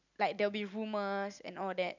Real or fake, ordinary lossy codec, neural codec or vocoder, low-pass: real; none; none; 7.2 kHz